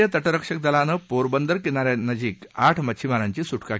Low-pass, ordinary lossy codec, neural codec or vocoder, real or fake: none; none; none; real